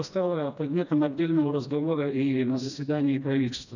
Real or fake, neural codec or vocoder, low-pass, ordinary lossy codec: fake; codec, 16 kHz, 1 kbps, FreqCodec, smaller model; 7.2 kHz; none